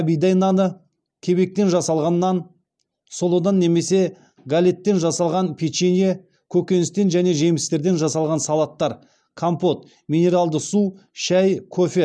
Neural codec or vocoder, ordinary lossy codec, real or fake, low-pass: none; none; real; none